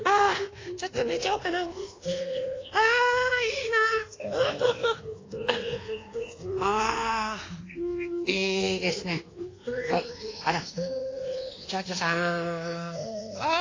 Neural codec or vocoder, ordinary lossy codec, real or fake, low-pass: codec, 24 kHz, 1.2 kbps, DualCodec; AAC, 32 kbps; fake; 7.2 kHz